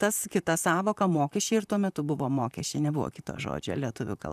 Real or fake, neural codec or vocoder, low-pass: fake; vocoder, 44.1 kHz, 128 mel bands, Pupu-Vocoder; 14.4 kHz